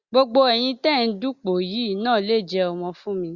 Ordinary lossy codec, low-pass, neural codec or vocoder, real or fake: Opus, 64 kbps; 7.2 kHz; none; real